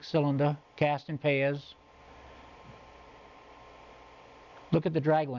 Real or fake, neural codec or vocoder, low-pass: real; none; 7.2 kHz